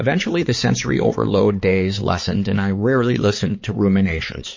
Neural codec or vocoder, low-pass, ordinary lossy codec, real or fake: codec, 16 kHz, 4 kbps, X-Codec, HuBERT features, trained on balanced general audio; 7.2 kHz; MP3, 32 kbps; fake